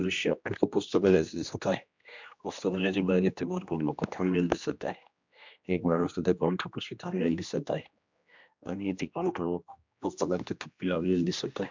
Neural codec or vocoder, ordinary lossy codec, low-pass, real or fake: codec, 16 kHz, 1 kbps, X-Codec, HuBERT features, trained on general audio; MP3, 64 kbps; 7.2 kHz; fake